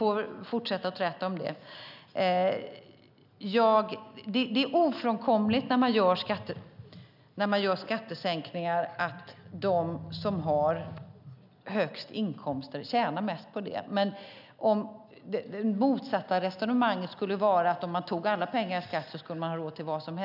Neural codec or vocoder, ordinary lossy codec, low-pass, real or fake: none; none; 5.4 kHz; real